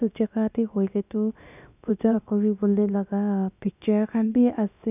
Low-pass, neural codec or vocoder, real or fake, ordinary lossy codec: 3.6 kHz; codec, 16 kHz, about 1 kbps, DyCAST, with the encoder's durations; fake; none